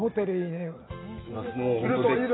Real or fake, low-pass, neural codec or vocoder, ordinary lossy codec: real; 7.2 kHz; none; AAC, 16 kbps